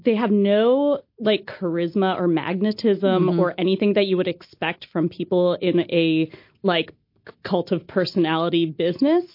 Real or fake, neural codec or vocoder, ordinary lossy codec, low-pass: real; none; MP3, 32 kbps; 5.4 kHz